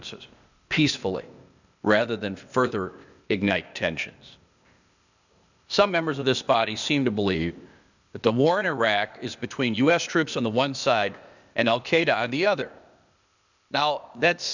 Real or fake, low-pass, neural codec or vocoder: fake; 7.2 kHz; codec, 16 kHz, 0.8 kbps, ZipCodec